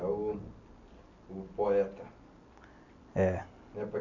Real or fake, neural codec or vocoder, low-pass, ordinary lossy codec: real; none; 7.2 kHz; none